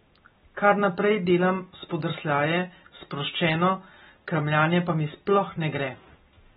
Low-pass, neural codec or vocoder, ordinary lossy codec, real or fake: 19.8 kHz; none; AAC, 16 kbps; real